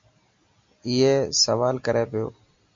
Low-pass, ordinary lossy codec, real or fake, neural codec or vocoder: 7.2 kHz; MP3, 48 kbps; real; none